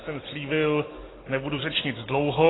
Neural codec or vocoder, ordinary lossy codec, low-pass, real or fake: none; AAC, 16 kbps; 7.2 kHz; real